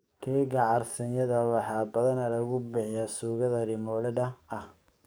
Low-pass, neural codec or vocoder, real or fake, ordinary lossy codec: none; codec, 44.1 kHz, 7.8 kbps, DAC; fake; none